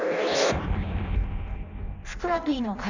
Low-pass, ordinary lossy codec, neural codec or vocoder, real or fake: 7.2 kHz; none; codec, 16 kHz in and 24 kHz out, 0.6 kbps, FireRedTTS-2 codec; fake